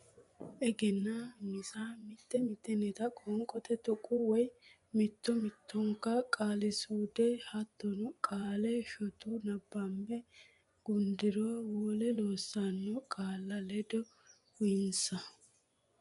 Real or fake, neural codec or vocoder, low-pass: fake; vocoder, 24 kHz, 100 mel bands, Vocos; 10.8 kHz